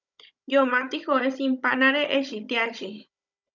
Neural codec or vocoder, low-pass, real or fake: codec, 16 kHz, 16 kbps, FunCodec, trained on Chinese and English, 50 frames a second; 7.2 kHz; fake